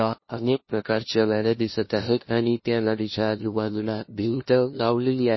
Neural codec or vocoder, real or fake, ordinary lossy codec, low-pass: codec, 16 kHz, 0.5 kbps, FunCodec, trained on LibriTTS, 25 frames a second; fake; MP3, 24 kbps; 7.2 kHz